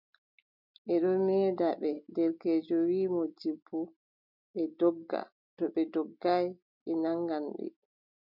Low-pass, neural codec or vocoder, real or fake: 5.4 kHz; none; real